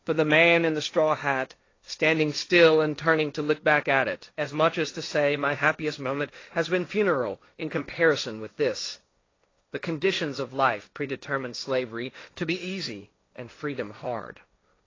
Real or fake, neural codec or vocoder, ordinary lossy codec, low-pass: fake; codec, 16 kHz, 1.1 kbps, Voila-Tokenizer; AAC, 32 kbps; 7.2 kHz